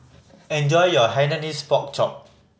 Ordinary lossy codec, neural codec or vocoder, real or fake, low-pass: none; none; real; none